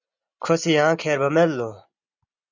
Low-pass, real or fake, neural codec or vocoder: 7.2 kHz; real; none